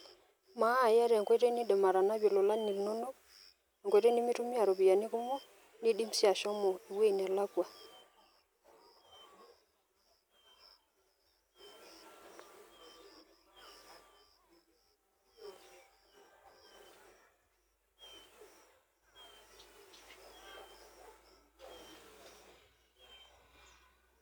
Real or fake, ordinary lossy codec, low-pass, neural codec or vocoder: real; none; none; none